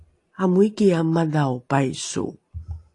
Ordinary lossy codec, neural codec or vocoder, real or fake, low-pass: AAC, 48 kbps; none; real; 10.8 kHz